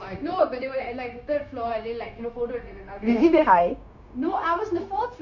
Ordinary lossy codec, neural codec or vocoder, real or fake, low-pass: none; codec, 16 kHz, 0.9 kbps, LongCat-Audio-Codec; fake; 7.2 kHz